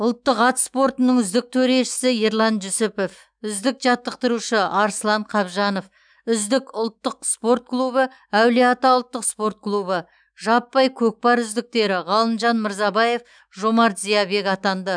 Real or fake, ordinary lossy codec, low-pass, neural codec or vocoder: fake; none; 9.9 kHz; autoencoder, 48 kHz, 128 numbers a frame, DAC-VAE, trained on Japanese speech